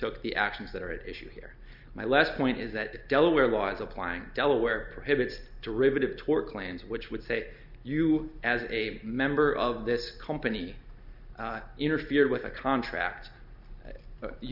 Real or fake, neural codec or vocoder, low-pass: real; none; 5.4 kHz